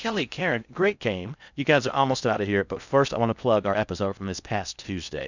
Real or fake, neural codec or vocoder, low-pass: fake; codec, 16 kHz in and 24 kHz out, 0.6 kbps, FocalCodec, streaming, 2048 codes; 7.2 kHz